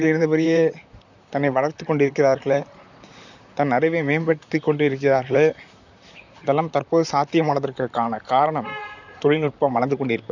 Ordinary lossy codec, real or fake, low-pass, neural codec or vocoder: none; fake; 7.2 kHz; vocoder, 44.1 kHz, 128 mel bands, Pupu-Vocoder